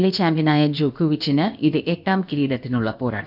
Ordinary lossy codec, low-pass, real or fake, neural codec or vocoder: none; 5.4 kHz; fake; codec, 16 kHz, about 1 kbps, DyCAST, with the encoder's durations